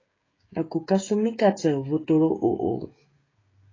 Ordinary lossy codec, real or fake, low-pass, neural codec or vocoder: AAC, 32 kbps; fake; 7.2 kHz; codec, 16 kHz, 16 kbps, FreqCodec, smaller model